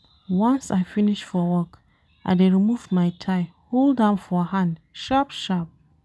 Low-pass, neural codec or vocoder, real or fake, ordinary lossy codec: none; none; real; none